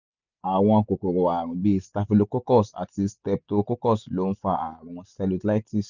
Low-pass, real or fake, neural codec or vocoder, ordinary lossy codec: 7.2 kHz; real; none; none